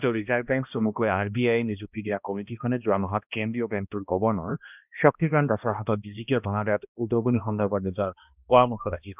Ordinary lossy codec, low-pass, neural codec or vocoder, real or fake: none; 3.6 kHz; codec, 16 kHz, 1 kbps, X-Codec, HuBERT features, trained on balanced general audio; fake